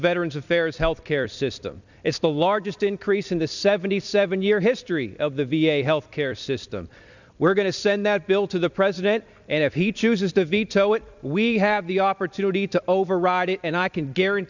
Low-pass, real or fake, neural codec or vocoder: 7.2 kHz; fake; vocoder, 44.1 kHz, 128 mel bands every 256 samples, BigVGAN v2